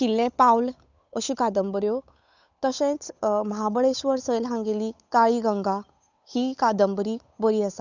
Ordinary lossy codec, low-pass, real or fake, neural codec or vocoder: none; 7.2 kHz; fake; codec, 16 kHz, 8 kbps, FunCodec, trained on Chinese and English, 25 frames a second